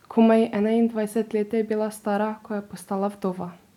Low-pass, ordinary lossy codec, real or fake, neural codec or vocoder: 19.8 kHz; none; real; none